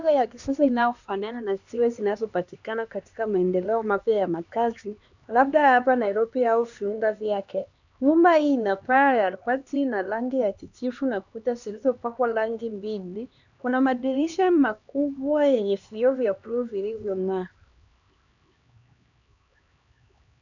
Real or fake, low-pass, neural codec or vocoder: fake; 7.2 kHz; codec, 16 kHz, 2 kbps, X-Codec, HuBERT features, trained on LibriSpeech